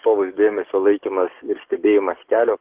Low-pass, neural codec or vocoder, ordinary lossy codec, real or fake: 3.6 kHz; codec, 44.1 kHz, 7.8 kbps, DAC; Opus, 16 kbps; fake